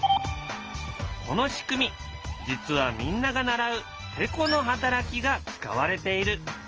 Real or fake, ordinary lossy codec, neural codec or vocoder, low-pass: real; Opus, 24 kbps; none; 7.2 kHz